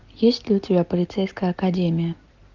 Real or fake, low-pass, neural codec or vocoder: real; 7.2 kHz; none